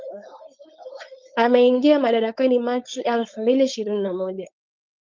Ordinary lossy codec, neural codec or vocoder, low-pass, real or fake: Opus, 24 kbps; codec, 16 kHz, 4.8 kbps, FACodec; 7.2 kHz; fake